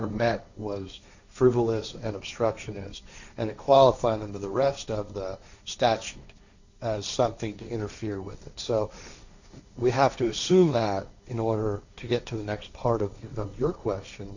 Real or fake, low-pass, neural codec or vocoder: fake; 7.2 kHz; codec, 16 kHz, 1.1 kbps, Voila-Tokenizer